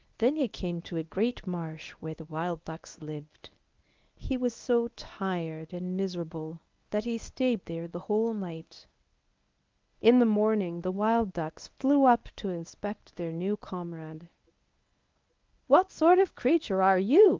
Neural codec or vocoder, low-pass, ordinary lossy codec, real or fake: codec, 24 kHz, 0.9 kbps, WavTokenizer, medium speech release version 1; 7.2 kHz; Opus, 32 kbps; fake